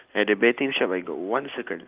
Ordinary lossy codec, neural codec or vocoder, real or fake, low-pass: Opus, 24 kbps; none; real; 3.6 kHz